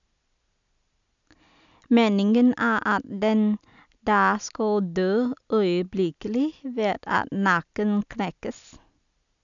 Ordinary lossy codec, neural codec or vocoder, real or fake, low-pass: none; none; real; 7.2 kHz